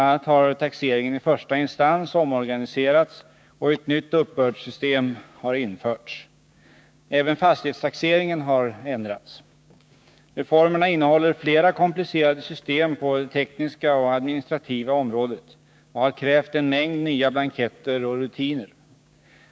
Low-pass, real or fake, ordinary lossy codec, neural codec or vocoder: none; fake; none; codec, 16 kHz, 6 kbps, DAC